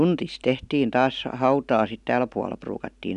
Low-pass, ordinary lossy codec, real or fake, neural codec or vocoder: 10.8 kHz; none; real; none